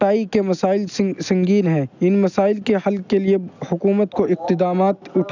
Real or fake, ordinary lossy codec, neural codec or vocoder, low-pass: real; none; none; 7.2 kHz